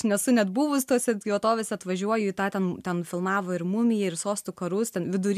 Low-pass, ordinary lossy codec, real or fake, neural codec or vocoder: 14.4 kHz; MP3, 96 kbps; real; none